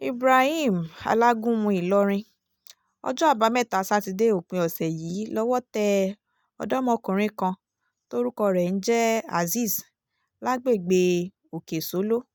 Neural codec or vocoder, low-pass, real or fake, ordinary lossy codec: none; none; real; none